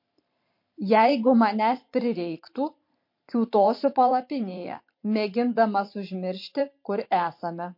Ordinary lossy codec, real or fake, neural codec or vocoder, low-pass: MP3, 32 kbps; fake; vocoder, 44.1 kHz, 128 mel bands every 512 samples, BigVGAN v2; 5.4 kHz